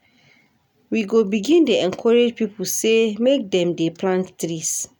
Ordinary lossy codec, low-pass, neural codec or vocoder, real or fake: none; 19.8 kHz; none; real